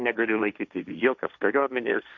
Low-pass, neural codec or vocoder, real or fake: 7.2 kHz; codec, 16 kHz, 1.1 kbps, Voila-Tokenizer; fake